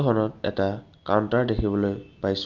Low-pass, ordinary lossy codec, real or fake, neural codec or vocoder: 7.2 kHz; Opus, 32 kbps; real; none